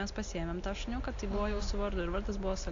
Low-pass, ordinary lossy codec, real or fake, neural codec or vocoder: 7.2 kHz; AAC, 48 kbps; real; none